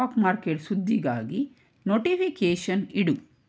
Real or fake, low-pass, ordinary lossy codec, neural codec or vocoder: real; none; none; none